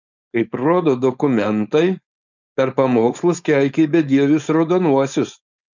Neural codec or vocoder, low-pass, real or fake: codec, 16 kHz, 4.8 kbps, FACodec; 7.2 kHz; fake